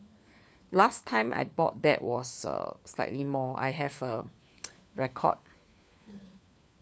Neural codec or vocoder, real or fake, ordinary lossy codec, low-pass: codec, 16 kHz, 4 kbps, FunCodec, trained on LibriTTS, 50 frames a second; fake; none; none